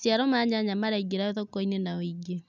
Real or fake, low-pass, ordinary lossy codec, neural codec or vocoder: real; 7.2 kHz; none; none